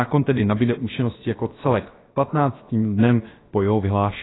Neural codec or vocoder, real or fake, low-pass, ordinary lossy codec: codec, 16 kHz, about 1 kbps, DyCAST, with the encoder's durations; fake; 7.2 kHz; AAC, 16 kbps